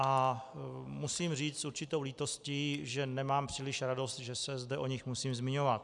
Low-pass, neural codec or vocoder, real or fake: 10.8 kHz; none; real